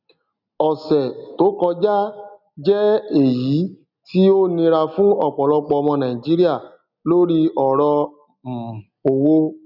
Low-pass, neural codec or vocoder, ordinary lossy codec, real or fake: 5.4 kHz; none; none; real